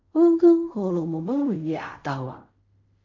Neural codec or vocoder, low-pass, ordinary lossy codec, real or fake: codec, 16 kHz in and 24 kHz out, 0.4 kbps, LongCat-Audio-Codec, fine tuned four codebook decoder; 7.2 kHz; MP3, 48 kbps; fake